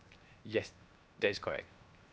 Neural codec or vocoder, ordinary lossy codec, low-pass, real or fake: codec, 16 kHz, 0.7 kbps, FocalCodec; none; none; fake